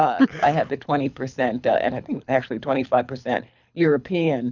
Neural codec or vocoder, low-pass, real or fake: codec, 24 kHz, 6 kbps, HILCodec; 7.2 kHz; fake